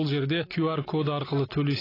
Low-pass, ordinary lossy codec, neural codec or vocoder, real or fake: 5.4 kHz; AAC, 24 kbps; none; real